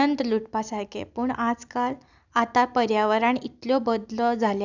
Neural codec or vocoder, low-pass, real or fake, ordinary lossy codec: none; 7.2 kHz; real; none